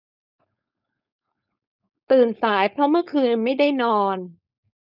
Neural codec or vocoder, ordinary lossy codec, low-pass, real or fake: codec, 16 kHz, 4.8 kbps, FACodec; none; 5.4 kHz; fake